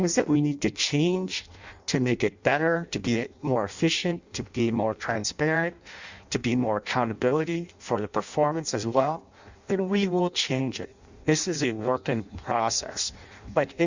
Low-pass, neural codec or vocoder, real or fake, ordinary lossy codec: 7.2 kHz; codec, 16 kHz in and 24 kHz out, 0.6 kbps, FireRedTTS-2 codec; fake; Opus, 64 kbps